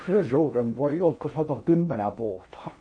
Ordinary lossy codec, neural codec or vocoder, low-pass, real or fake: MP3, 48 kbps; codec, 16 kHz in and 24 kHz out, 0.6 kbps, FocalCodec, streaming, 4096 codes; 9.9 kHz; fake